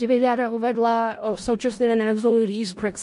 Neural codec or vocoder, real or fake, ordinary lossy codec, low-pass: codec, 16 kHz in and 24 kHz out, 0.4 kbps, LongCat-Audio-Codec, four codebook decoder; fake; MP3, 48 kbps; 10.8 kHz